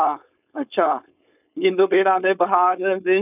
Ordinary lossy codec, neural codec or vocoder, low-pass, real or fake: none; codec, 16 kHz, 4.8 kbps, FACodec; 3.6 kHz; fake